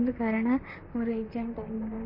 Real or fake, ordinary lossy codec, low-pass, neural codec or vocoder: fake; MP3, 32 kbps; 5.4 kHz; vocoder, 44.1 kHz, 128 mel bands, Pupu-Vocoder